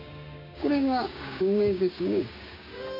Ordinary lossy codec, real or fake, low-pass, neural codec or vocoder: none; fake; 5.4 kHz; codec, 16 kHz, 6 kbps, DAC